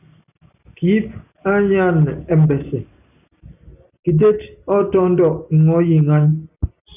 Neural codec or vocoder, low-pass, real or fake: none; 3.6 kHz; real